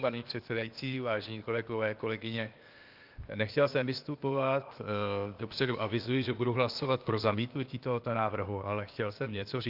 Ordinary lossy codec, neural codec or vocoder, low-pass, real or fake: Opus, 24 kbps; codec, 16 kHz, 0.8 kbps, ZipCodec; 5.4 kHz; fake